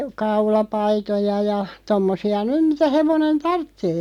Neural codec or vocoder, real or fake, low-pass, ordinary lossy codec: none; real; 19.8 kHz; none